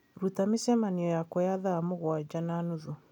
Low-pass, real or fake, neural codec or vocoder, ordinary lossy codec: 19.8 kHz; real; none; none